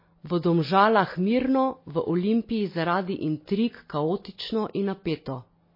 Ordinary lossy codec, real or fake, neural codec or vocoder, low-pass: MP3, 24 kbps; real; none; 5.4 kHz